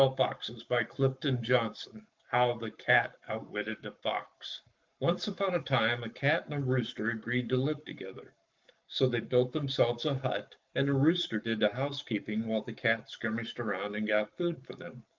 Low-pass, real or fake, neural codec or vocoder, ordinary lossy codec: 7.2 kHz; fake; codec, 44.1 kHz, 7.8 kbps, Pupu-Codec; Opus, 32 kbps